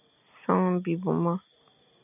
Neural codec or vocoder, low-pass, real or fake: none; 3.6 kHz; real